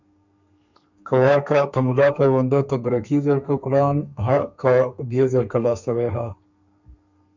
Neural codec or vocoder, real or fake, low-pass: codec, 32 kHz, 1.9 kbps, SNAC; fake; 7.2 kHz